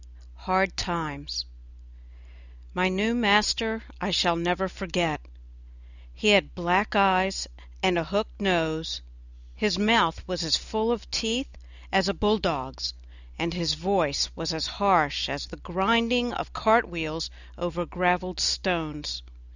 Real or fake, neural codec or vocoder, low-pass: real; none; 7.2 kHz